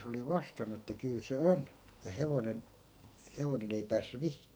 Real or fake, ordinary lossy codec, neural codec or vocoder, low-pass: fake; none; codec, 44.1 kHz, 2.6 kbps, SNAC; none